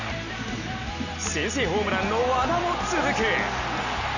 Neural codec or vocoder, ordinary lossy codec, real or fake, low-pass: none; none; real; 7.2 kHz